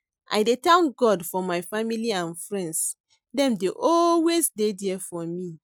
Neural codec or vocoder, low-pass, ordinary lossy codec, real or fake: none; none; none; real